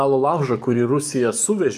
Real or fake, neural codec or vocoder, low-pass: fake; codec, 44.1 kHz, 7.8 kbps, DAC; 14.4 kHz